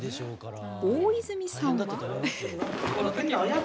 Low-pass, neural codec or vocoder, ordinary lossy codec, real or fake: none; none; none; real